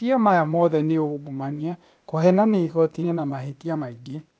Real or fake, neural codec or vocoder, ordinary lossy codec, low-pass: fake; codec, 16 kHz, 0.8 kbps, ZipCodec; none; none